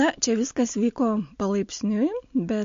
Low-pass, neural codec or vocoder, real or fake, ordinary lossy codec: 7.2 kHz; none; real; MP3, 48 kbps